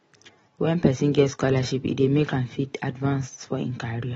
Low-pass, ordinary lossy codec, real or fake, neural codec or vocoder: 19.8 kHz; AAC, 24 kbps; real; none